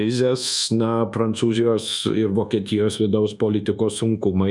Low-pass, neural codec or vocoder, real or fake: 10.8 kHz; codec, 24 kHz, 1.2 kbps, DualCodec; fake